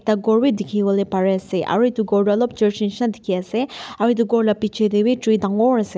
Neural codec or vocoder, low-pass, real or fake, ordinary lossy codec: none; none; real; none